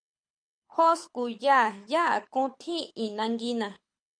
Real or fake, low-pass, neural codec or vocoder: fake; 9.9 kHz; codec, 24 kHz, 6 kbps, HILCodec